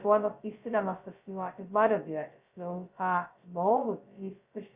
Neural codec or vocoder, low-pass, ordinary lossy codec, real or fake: codec, 16 kHz, 0.2 kbps, FocalCodec; 3.6 kHz; Opus, 64 kbps; fake